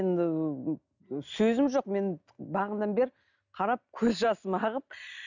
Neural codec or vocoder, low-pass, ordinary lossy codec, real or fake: none; 7.2 kHz; none; real